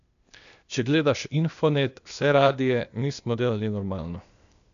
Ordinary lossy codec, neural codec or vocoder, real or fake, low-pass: none; codec, 16 kHz, 0.8 kbps, ZipCodec; fake; 7.2 kHz